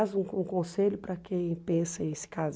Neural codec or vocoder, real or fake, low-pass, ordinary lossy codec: none; real; none; none